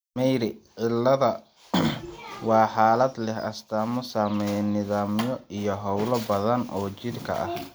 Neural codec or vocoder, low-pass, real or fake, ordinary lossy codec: none; none; real; none